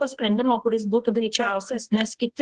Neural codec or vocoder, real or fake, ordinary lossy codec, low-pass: codec, 24 kHz, 0.9 kbps, WavTokenizer, medium music audio release; fake; Opus, 16 kbps; 10.8 kHz